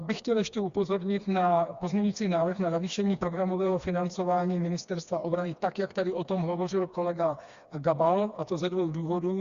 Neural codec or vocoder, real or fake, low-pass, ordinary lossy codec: codec, 16 kHz, 2 kbps, FreqCodec, smaller model; fake; 7.2 kHz; Opus, 64 kbps